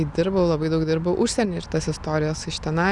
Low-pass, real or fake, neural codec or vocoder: 10.8 kHz; real; none